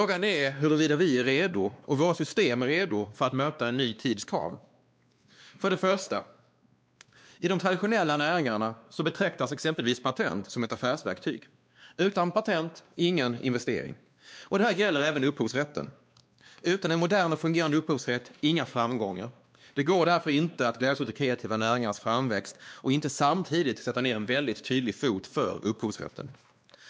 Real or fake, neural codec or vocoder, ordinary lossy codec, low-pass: fake; codec, 16 kHz, 2 kbps, X-Codec, WavLM features, trained on Multilingual LibriSpeech; none; none